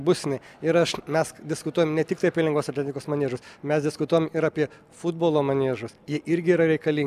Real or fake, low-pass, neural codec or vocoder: real; 14.4 kHz; none